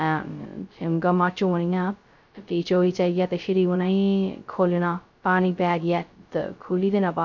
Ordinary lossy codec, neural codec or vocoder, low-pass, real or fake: none; codec, 16 kHz, 0.2 kbps, FocalCodec; 7.2 kHz; fake